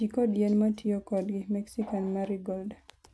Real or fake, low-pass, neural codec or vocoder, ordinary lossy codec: real; none; none; none